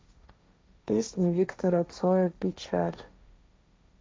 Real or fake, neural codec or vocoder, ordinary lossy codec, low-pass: fake; codec, 16 kHz, 1.1 kbps, Voila-Tokenizer; none; none